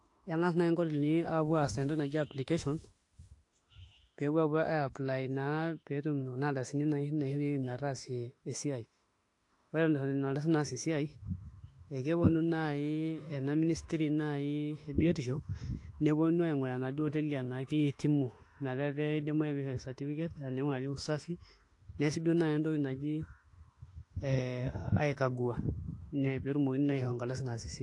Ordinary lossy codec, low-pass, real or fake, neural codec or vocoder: AAC, 48 kbps; 10.8 kHz; fake; autoencoder, 48 kHz, 32 numbers a frame, DAC-VAE, trained on Japanese speech